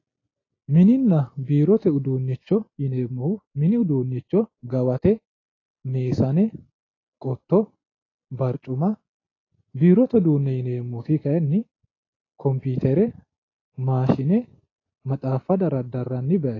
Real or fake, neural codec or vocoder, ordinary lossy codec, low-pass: real; none; AAC, 32 kbps; 7.2 kHz